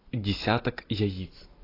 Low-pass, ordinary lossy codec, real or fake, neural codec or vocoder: 5.4 kHz; AAC, 24 kbps; real; none